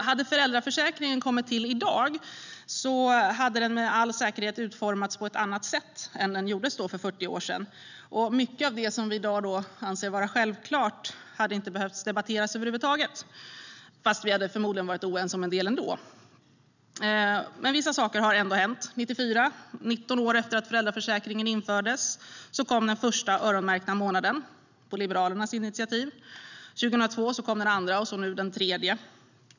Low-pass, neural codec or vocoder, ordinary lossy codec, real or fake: 7.2 kHz; none; none; real